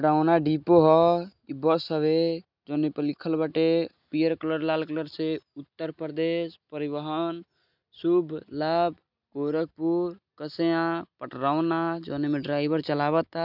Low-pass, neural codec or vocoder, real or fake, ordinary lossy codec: 5.4 kHz; none; real; none